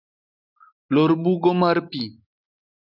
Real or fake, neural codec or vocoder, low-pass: real; none; 5.4 kHz